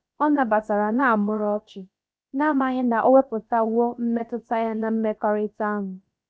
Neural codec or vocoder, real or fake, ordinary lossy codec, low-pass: codec, 16 kHz, about 1 kbps, DyCAST, with the encoder's durations; fake; none; none